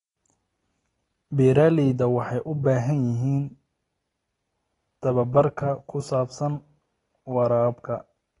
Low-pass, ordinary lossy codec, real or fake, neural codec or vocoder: 10.8 kHz; AAC, 32 kbps; real; none